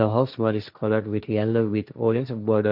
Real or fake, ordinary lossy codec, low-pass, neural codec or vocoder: fake; none; 5.4 kHz; codec, 16 kHz, 1.1 kbps, Voila-Tokenizer